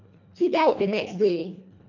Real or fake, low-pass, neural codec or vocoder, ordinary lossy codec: fake; 7.2 kHz; codec, 24 kHz, 1.5 kbps, HILCodec; none